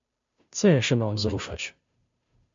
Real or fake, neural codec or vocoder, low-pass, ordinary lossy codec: fake; codec, 16 kHz, 0.5 kbps, FunCodec, trained on Chinese and English, 25 frames a second; 7.2 kHz; MP3, 48 kbps